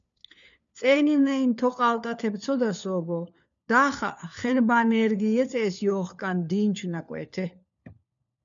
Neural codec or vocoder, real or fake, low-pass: codec, 16 kHz, 4 kbps, FunCodec, trained on LibriTTS, 50 frames a second; fake; 7.2 kHz